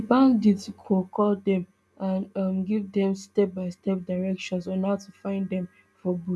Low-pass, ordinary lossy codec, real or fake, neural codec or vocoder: none; none; real; none